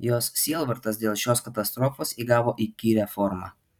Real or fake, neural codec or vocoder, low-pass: fake; vocoder, 44.1 kHz, 128 mel bands every 256 samples, BigVGAN v2; 19.8 kHz